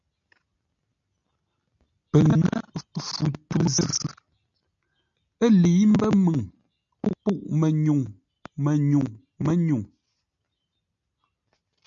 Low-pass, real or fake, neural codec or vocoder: 7.2 kHz; real; none